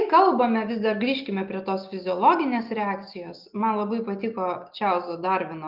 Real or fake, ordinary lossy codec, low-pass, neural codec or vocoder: real; Opus, 32 kbps; 5.4 kHz; none